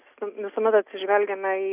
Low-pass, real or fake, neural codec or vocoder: 3.6 kHz; real; none